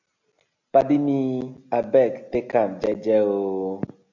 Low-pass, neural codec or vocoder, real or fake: 7.2 kHz; none; real